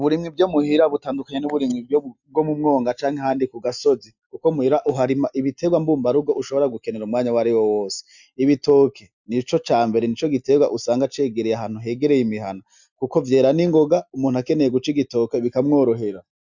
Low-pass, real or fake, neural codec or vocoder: 7.2 kHz; real; none